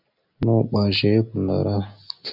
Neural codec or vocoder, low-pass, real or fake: none; 5.4 kHz; real